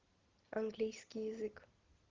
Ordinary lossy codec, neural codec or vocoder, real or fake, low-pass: Opus, 16 kbps; none; real; 7.2 kHz